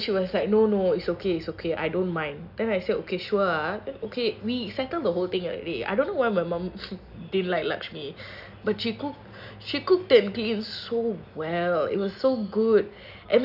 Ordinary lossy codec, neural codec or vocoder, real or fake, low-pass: none; none; real; 5.4 kHz